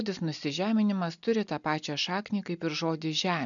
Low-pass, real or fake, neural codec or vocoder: 7.2 kHz; real; none